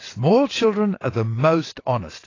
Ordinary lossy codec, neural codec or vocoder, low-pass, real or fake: AAC, 32 kbps; vocoder, 22.05 kHz, 80 mel bands, WaveNeXt; 7.2 kHz; fake